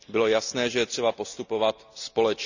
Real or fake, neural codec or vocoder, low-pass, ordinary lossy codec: real; none; 7.2 kHz; none